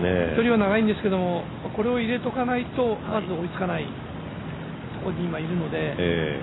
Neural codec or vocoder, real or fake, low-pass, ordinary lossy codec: none; real; 7.2 kHz; AAC, 16 kbps